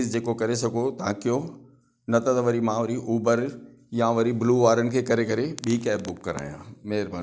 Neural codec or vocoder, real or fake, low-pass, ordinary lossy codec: none; real; none; none